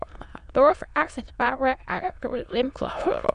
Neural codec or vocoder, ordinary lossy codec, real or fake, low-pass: autoencoder, 22.05 kHz, a latent of 192 numbers a frame, VITS, trained on many speakers; none; fake; 9.9 kHz